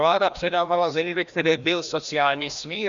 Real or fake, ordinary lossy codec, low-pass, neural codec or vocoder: fake; Opus, 64 kbps; 7.2 kHz; codec, 16 kHz, 1 kbps, FreqCodec, larger model